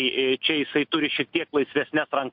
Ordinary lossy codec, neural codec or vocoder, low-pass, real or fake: MP3, 48 kbps; none; 5.4 kHz; real